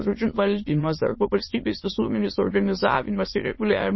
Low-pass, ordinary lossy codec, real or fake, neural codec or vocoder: 7.2 kHz; MP3, 24 kbps; fake; autoencoder, 22.05 kHz, a latent of 192 numbers a frame, VITS, trained on many speakers